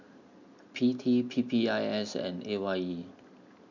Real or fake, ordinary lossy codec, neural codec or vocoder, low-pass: real; none; none; 7.2 kHz